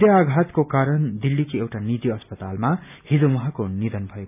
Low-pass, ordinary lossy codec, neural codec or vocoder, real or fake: 3.6 kHz; none; none; real